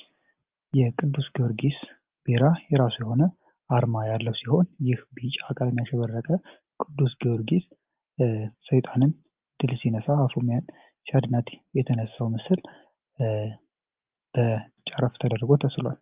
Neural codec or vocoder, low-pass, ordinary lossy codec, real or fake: none; 3.6 kHz; Opus, 24 kbps; real